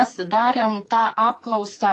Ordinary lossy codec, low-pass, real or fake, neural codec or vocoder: AAC, 48 kbps; 10.8 kHz; fake; codec, 44.1 kHz, 2.6 kbps, SNAC